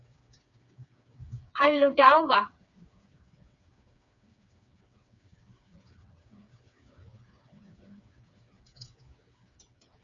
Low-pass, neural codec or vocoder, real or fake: 7.2 kHz; codec, 16 kHz, 4 kbps, FreqCodec, smaller model; fake